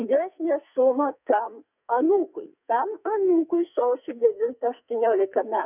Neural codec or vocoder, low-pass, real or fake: codec, 44.1 kHz, 2.6 kbps, SNAC; 3.6 kHz; fake